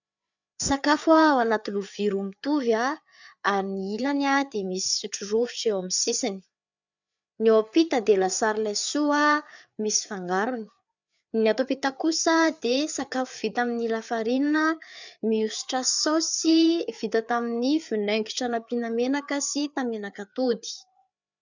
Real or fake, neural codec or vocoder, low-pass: fake; codec, 16 kHz, 4 kbps, FreqCodec, larger model; 7.2 kHz